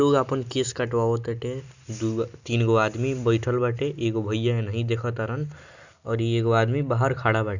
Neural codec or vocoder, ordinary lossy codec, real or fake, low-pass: none; none; real; 7.2 kHz